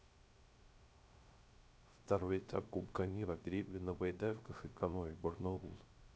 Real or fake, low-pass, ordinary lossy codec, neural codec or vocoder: fake; none; none; codec, 16 kHz, 0.3 kbps, FocalCodec